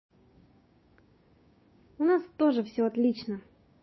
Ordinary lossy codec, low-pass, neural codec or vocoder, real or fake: MP3, 24 kbps; 7.2 kHz; codec, 16 kHz, 6 kbps, DAC; fake